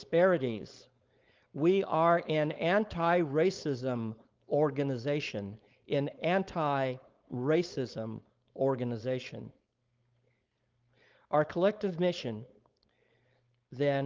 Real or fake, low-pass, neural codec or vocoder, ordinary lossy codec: fake; 7.2 kHz; codec, 16 kHz, 4.8 kbps, FACodec; Opus, 32 kbps